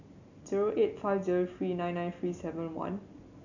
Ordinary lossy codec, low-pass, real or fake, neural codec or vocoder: none; 7.2 kHz; real; none